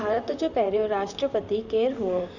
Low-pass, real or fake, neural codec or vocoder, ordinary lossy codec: 7.2 kHz; fake; vocoder, 44.1 kHz, 128 mel bands, Pupu-Vocoder; none